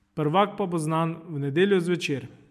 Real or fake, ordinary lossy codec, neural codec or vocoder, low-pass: real; MP3, 96 kbps; none; 14.4 kHz